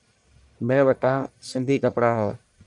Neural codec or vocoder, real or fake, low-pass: codec, 44.1 kHz, 1.7 kbps, Pupu-Codec; fake; 10.8 kHz